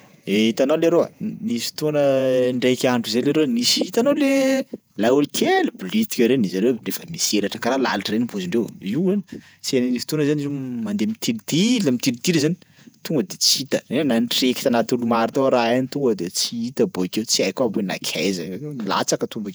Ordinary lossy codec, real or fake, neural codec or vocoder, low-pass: none; fake; vocoder, 48 kHz, 128 mel bands, Vocos; none